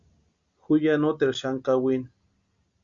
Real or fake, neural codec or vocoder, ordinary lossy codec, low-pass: real; none; Opus, 64 kbps; 7.2 kHz